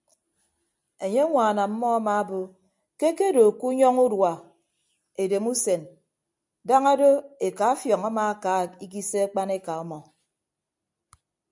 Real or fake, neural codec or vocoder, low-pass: real; none; 10.8 kHz